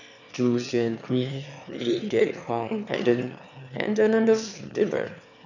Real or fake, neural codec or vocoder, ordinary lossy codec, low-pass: fake; autoencoder, 22.05 kHz, a latent of 192 numbers a frame, VITS, trained on one speaker; none; 7.2 kHz